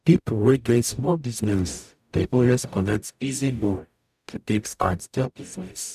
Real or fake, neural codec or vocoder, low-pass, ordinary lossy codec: fake; codec, 44.1 kHz, 0.9 kbps, DAC; 14.4 kHz; none